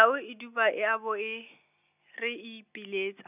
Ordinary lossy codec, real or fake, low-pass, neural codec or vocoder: none; real; 3.6 kHz; none